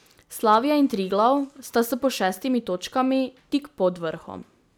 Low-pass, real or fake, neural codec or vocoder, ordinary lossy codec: none; real; none; none